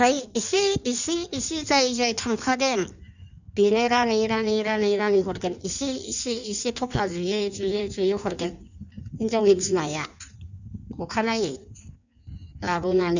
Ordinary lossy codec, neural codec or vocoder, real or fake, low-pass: none; codec, 16 kHz in and 24 kHz out, 1.1 kbps, FireRedTTS-2 codec; fake; 7.2 kHz